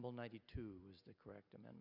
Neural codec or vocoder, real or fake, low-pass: none; real; 5.4 kHz